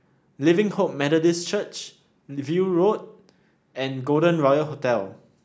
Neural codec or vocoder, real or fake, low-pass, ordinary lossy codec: none; real; none; none